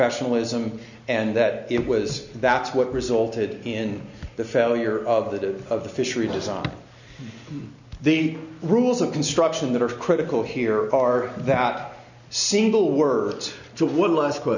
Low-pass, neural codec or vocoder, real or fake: 7.2 kHz; none; real